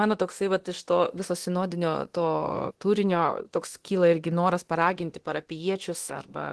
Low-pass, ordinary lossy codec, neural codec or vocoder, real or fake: 10.8 kHz; Opus, 16 kbps; codec, 24 kHz, 0.9 kbps, DualCodec; fake